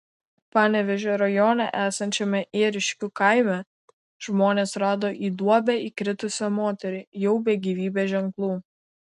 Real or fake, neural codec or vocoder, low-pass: real; none; 10.8 kHz